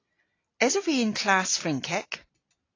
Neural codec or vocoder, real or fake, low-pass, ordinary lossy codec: none; real; 7.2 kHz; AAC, 32 kbps